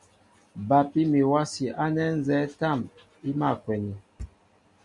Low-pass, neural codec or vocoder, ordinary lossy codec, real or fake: 10.8 kHz; none; MP3, 96 kbps; real